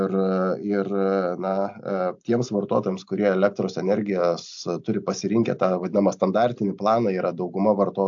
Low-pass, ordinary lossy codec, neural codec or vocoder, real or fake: 7.2 kHz; AAC, 64 kbps; none; real